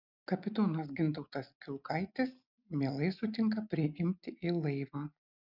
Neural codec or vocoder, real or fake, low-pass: none; real; 5.4 kHz